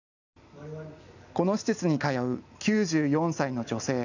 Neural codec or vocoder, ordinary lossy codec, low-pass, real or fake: none; none; 7.2 kHz; real